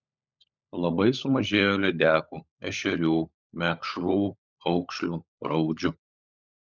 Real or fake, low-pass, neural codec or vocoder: fake; 7.2 kHz; codec, 16 kHz, 16 kbps, FunCodec, trained on LibriTTS, 50 frames a second